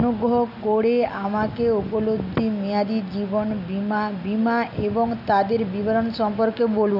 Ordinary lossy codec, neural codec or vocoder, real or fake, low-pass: none; none; real; 5.4 kHz